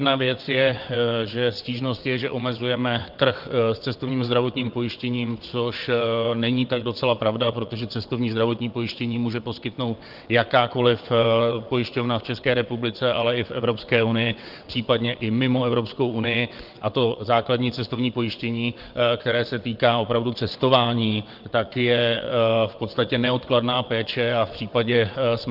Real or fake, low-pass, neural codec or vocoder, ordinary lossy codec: fake; 5.4 kHz; vocoder, 22.05 kHz, 80 mel bands, WaveNeXt; Opus, 32 kbps